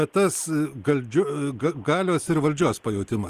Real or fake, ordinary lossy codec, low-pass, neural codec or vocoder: real; Opus, 24 kbps; 14.4 kHz; none